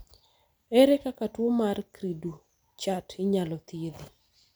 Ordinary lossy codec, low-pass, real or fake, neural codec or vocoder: none; none; real; none